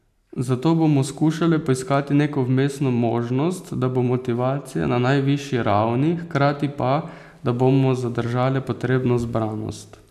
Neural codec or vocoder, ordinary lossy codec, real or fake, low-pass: none; none; real; 14.4 kHz